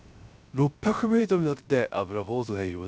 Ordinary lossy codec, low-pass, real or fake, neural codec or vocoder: none; none; fake; codec, 16 kHz, 0.3 kbps, FocalCodec